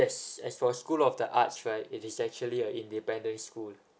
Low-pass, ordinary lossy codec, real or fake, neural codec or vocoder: none; none; real; none